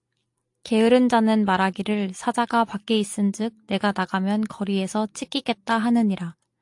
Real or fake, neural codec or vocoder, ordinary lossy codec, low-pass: real; none; AAC, 64 kbps; 10.8 kHz